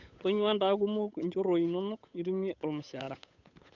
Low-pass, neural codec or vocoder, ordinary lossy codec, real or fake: 7.2 kHz; codec, 16 kHz, 8 kbps, FunCodec, trained on Chinese and English, 25 frames a second; none; fake